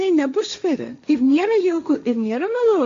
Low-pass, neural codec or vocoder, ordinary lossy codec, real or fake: 7.2 kHz; codec, 16 kHz, 1.1 kbps, Voila-Tokenizer; AAC, 64 kbps; fake